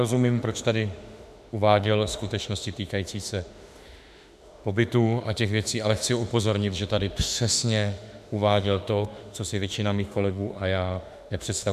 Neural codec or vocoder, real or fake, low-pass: autoencoder, 48 kHz, 32 numbers a frame, DAC-VAE, trained on Japanese speech; fake; 14.4 kHz